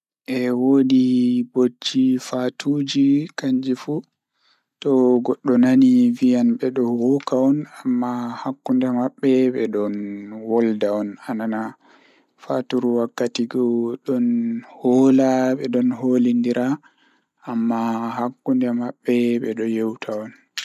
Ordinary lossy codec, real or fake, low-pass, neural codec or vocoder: none; real; 14.4 kHz; none